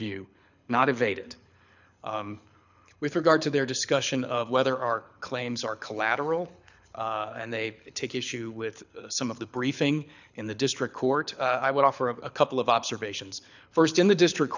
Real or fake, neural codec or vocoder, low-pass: fake; codec, 24 kHz, 6 kbps, HILCodec; 7.2 kHz